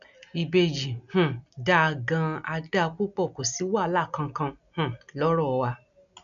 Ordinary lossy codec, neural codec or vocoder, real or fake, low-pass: none; none; real; 7.2 kHz